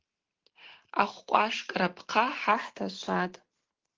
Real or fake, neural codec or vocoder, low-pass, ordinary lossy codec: real; none; 7.2 kHz; Opus, 16 kbps